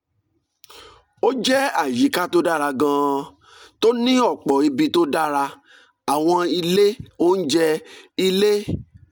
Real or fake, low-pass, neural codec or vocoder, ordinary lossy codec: real; none; none; none